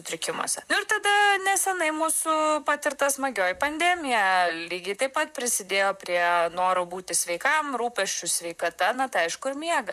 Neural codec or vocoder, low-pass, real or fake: vocoder, 44.1 kHz, 128 mel bands, Pupu-Vocoder; 14.4 kHz; fake